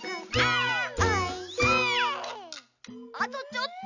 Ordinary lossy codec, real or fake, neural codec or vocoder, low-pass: none; real; none; 7.2 kHz